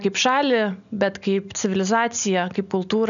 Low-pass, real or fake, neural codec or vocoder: 7.2 kHz; real; none